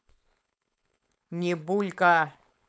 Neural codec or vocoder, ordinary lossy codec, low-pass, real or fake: codec, 16 kHz, 4.8 kbps, FACodec; none; none; fake